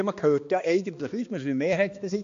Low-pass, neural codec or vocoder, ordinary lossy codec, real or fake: 7.2 kHz; codec, 16 kHz, 2 kbps, X-Codec, HuBERT features, trained on balanced general audio; AAC, 48 kbps; fake